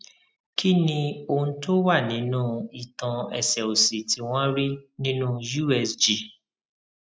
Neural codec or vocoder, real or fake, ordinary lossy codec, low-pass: none; real; none; none